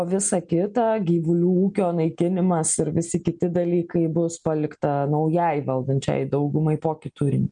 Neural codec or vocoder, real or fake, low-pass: none; real; 9.9 kHz